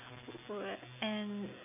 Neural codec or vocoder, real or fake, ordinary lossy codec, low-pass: none; real; none; 3.6 kHz